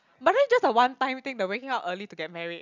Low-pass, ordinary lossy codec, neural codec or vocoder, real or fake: 7.2 kHz; none; none; real